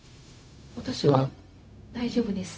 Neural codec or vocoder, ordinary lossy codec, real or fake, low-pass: codec, 16 kHz, 0.4 kbps, LongCat-Audio-Codec; none; fake; none